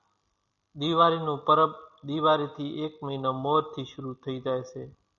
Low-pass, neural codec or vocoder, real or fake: 7.2 kHz; none; real